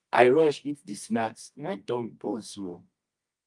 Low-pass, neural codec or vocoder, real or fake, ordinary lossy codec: 10.8 kHz; codec, 24 kHz, 0.9 kbps, WavTokenizer, medium music audio release; fake; Opus, 32 kbps